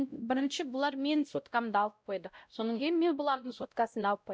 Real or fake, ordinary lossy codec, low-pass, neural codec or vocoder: fake; none; none; codec, 16 kHz, 0.5 kbps, X-Codec, WavLM features, trained on Multilingual LibriSpeech